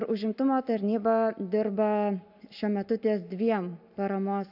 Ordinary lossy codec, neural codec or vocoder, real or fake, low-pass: AAC, 48 kbps; none; real; 5.4 kHz